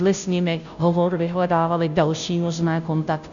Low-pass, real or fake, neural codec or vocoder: 7.2 kHz; fake; codec, 16 kHz, 0.5 kbps, FunCodec, trained on Chinese and English, 25 frames a second